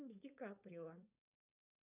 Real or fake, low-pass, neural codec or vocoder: fake; 3.6 kHz; codec, 16 kHz, 4.8 kbps, FACodec